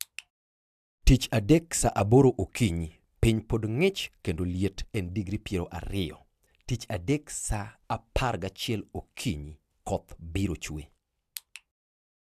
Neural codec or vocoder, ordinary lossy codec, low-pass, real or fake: none; AAC, 96 kbps; 14.4 kHz; real